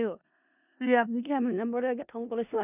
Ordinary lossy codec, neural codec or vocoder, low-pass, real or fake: none; codec, 16 kHz in and 24 kHz out, 0.4 kbps, LongCat-Audio-Codec, four codebook decoder; 3.6 kHz; fake